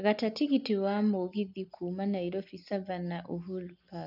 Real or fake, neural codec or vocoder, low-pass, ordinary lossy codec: real; none; 5.4 kHz; none